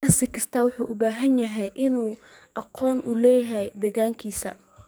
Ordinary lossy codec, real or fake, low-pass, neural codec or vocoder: none; fake; none; codec, 44.1 kHz, 2.6 kbps, SNAC